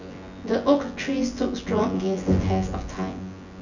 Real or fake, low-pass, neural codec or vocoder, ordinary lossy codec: fake; 7.2 kHz; vocoder, 24 kHz, 100 mel bands, Vocos; none